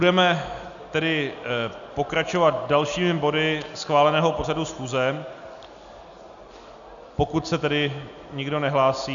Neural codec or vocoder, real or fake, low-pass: none; real; 7.2 kHz